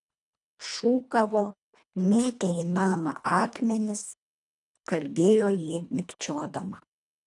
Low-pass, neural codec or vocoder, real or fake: 10.8 kHz; codec, 24 kHz, 1.5 kbps, HILCodec; fake